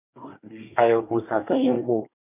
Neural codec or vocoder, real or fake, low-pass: codec, 24 kHz, 1 kbps, SNAC; fake; 3.6 kHz